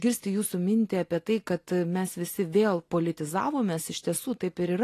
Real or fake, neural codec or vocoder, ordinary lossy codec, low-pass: real; none; AAC, 48 kbps; 14.4 kHz